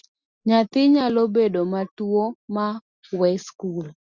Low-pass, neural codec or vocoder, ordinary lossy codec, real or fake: 7.2 kHz; none; Opus, 64 kbps; real